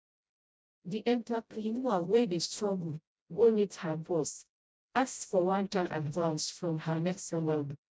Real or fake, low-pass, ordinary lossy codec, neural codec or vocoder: fake; none; none; codec, 16 kHz, 0.5 kbps, FreqCodec, smaller model